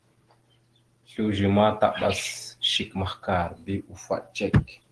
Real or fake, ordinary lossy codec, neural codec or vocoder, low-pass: real; Opus, 16 kbps; none; 10.8 kHz